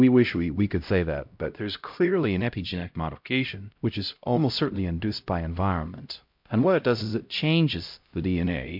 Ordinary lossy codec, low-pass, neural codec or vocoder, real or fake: MP3, 48 kbps; 5.4 kHz; codec, 16 kHz, 0.5 kbps, X-Codec, HuBERT features, trained on LibriSpeech; fake